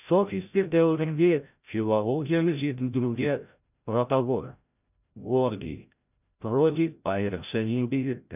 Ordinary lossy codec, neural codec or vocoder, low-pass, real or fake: none; codec, 16 kHz, 0.5 kbps, FreqCodec, larger model; 3.6 kHz; fake